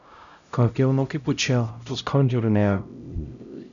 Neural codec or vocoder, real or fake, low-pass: codec, 16 kHz, 0.5 kbps, X-Codec, HuBERT features, trained on LibriSpeech; fake; 7.2 kHz